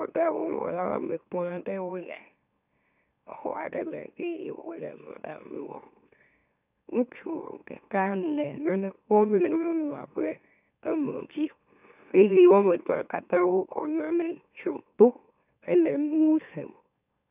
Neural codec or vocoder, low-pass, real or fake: autoencoder, 44.1 kHz, a latent of 192 numbers a frame, MeloTTS; 3.6 kHz; fake